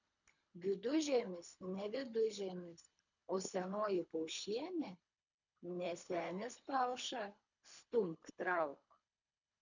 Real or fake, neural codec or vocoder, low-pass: fake; codec, 24 kHz, 3 kbps, HILCodec; 7.2 kHz